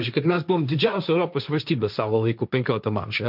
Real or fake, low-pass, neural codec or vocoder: fake; 5.4 kHz; codec, 16 kHz, 1.1 kbps, Voila-Tokenizer